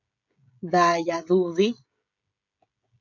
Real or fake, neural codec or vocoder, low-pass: fake; codec, 16 kHz, 16 kbps, FreqCodec, smaller model; 7.2 kHz